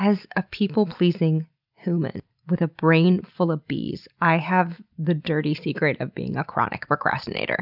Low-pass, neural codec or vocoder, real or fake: 5.4 kHz; none; real